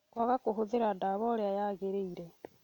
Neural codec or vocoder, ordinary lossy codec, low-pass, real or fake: none; none; 19.8 kHz; real